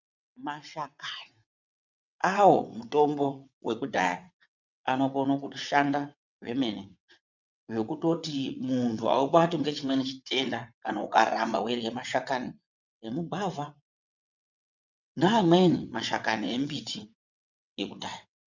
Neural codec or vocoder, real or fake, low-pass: vocoder, 22.05 kHz, 80 mel bands, WaveNeXt; fake; 7.2 kHz